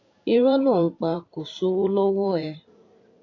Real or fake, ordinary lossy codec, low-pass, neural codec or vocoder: fake; AAC, 32 kbps; 7.2 kHz; vocoder, 44.1 kHz, 128 mel bands every 512 samples, BigVGAN v2